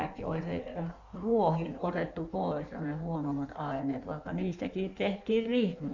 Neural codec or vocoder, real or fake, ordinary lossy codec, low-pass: codec, 16 kHz in and 24 kHz out, 1.1 kbps, FireRedTTS-2 codec; fake; none; 7.2 kHz